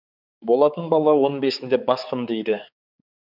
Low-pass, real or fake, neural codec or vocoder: 5.4 kHz; fake; codec, 16 kHz, 4 kbps, X-Codec, HuBERT features, trained on balanced general audio